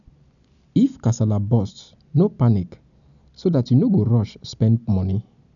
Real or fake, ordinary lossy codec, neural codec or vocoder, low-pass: real; none; none; 7.2 kHz